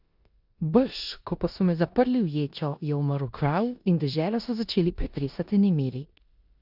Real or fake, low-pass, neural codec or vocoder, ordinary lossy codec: fake; 5.4 kHz; codec, 16 kHz in and 24 kHz out, 0.9 kbps, LongCat-Audio-Codec, four codebook decoder; Opus, 64 kbps